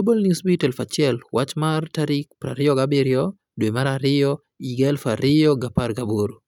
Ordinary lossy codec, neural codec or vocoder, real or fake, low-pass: none; none; real; 19.8 kHz